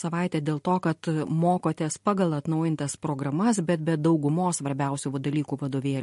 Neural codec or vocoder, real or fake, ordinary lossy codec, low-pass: none; real; MP3, 48 kbps; 14.4 kHz